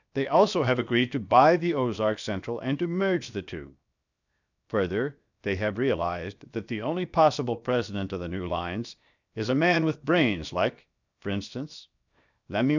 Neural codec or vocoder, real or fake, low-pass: codec, 16 kHz, 0.7 kbps, FocalCodec; fake; 7.2 kHz